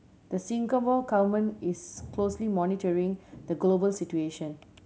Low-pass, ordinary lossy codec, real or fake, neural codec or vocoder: none; none; real; none